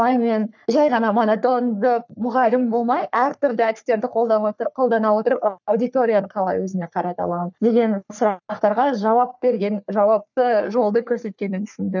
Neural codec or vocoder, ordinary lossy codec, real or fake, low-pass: codec, 44.1 kHz, 3.4 kbps, Pupu-Codec; none; fake; 7.2 kHz